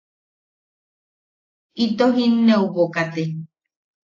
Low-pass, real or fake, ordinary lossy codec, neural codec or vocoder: 7.2 kHz; real; AAC, 32 kbps; none